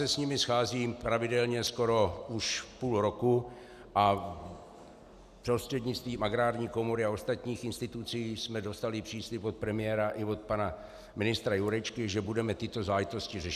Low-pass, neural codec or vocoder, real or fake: 14.4 kHz; none; real